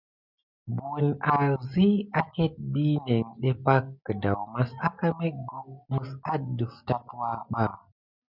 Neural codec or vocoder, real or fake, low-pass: none; real; 5.4 kHz